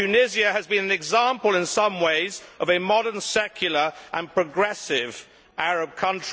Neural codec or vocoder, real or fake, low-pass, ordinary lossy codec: none; real; none; none